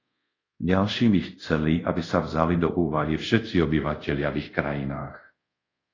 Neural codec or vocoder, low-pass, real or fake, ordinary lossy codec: codec, 24 kHz, 0.5 kbps, DualCodec; 7.2 kHz; fake; AAC, 32 kbps